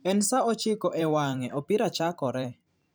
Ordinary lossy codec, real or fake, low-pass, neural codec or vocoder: none; fake; none; vocoder, 44.1 kHz, 128 mel bands every 512 samples, BigVGAN v2